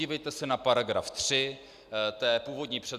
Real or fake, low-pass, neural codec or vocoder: real; 14.4 kHz; none